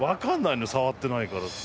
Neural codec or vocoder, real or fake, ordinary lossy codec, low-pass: none; real; none; none